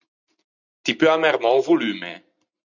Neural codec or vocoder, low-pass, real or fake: none; 7.2 kHz; real